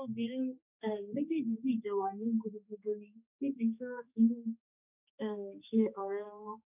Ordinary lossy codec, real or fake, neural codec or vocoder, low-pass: none; fake; codec, 16 kHz, 2 kbps, X-Codec, HuBERT features, trained on general audio; 3.6 kHz